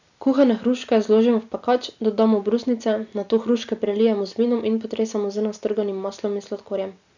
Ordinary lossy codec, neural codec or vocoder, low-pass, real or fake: none; none; 7.2 kHz; real